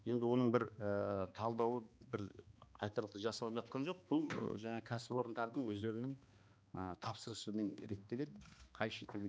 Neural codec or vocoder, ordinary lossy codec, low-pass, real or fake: codec, 16 kHz, 2 kbps, X-Codec, HuBERT features, trained on balanced general audio; none; none; fake